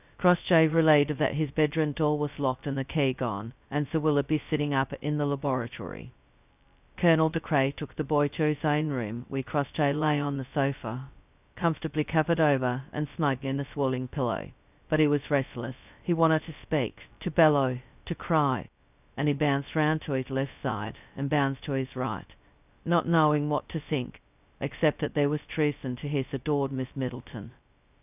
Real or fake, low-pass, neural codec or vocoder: fake; 3.6 kHz; codec, 16 kHz, 0.2 kbps, FocalCodec